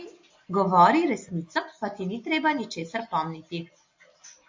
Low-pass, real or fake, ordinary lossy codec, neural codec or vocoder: 7.2 kHz; real; MP3, 48 kbps; none